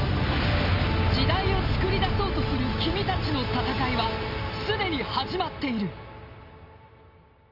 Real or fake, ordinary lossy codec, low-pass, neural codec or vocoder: real; none; 5.4 kHz; none